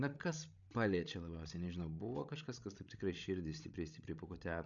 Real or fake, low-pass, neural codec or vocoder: fake; 7.2 kHz; codec, 16 kHz, 16 kbps, FreqCodec, larger model